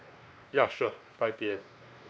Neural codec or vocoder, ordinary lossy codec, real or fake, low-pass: codec, 16 kHz, 2 kbps, X-Codec, WavLM features, trained on Multilingual LibriSpeech; none; fake; none